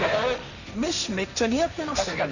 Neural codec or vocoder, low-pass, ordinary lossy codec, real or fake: codec, 16 kHz, 1.1 kbps, Voila-Tokenizer; 7.2 kHz; none; fake